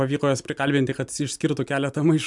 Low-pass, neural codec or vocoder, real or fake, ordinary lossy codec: 10.8 kHz; none; real; MP3, 96 kbps